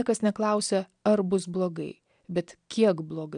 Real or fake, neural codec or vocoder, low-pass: real; none; 9.9 kHz